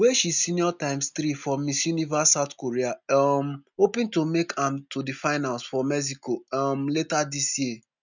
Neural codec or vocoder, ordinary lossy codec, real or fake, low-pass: none; none; real; 7.2 kHz